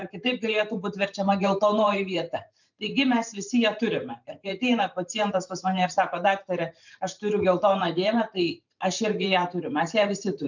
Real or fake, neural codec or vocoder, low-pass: fake; vocoder, 44.1 kHz, 128 mel bands every 512 samples, BigVGAN v2; 7.2 kHz